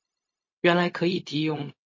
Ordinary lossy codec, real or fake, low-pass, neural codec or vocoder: MP3, 32 kbps; fake; 7.2 kHz; codec, 16 kHz, 0.4 kbps, LongCat-Audio-Codec